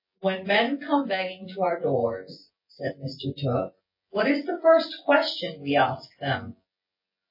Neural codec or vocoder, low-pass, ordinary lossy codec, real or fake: vocoder, 24 kHz, 100 mel bands, Vocos; 5.4 kHz; MP3, 24 kbps; fake